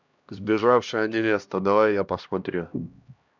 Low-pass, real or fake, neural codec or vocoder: 7.2 kHz; fake; codec, 16 kHz, 1 kbps, X-Codec, HuBERT features, trained on LibriSpeech